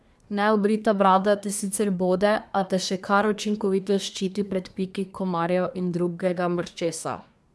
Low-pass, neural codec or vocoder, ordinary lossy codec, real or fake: none; codec, 24 kHz, 1 kbps, SNAC; none; fake